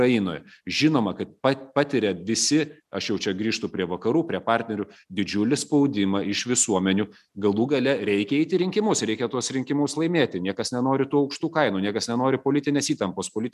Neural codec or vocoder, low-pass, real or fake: none; 14.4 kHz; real